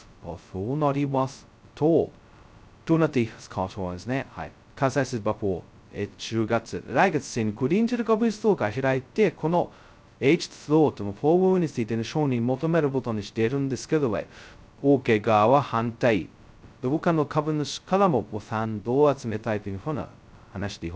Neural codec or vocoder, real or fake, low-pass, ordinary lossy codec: codec, 16 kHz, 0.2 kbps, FocalCodec; fake; none; none